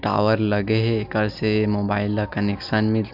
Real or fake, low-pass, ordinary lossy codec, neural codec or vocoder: real; 5.4 kHz; none; none